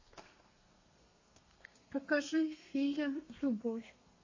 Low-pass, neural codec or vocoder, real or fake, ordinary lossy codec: 7.2 kHz; codec, 32 kHz, 1.9 kbps, SNAC; fake; MP3, 32 kbps